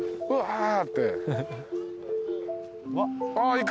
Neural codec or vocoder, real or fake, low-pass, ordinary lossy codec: none; real; none; none